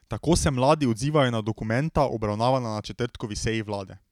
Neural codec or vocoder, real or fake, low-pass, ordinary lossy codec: none; real; 19.8 kHz; none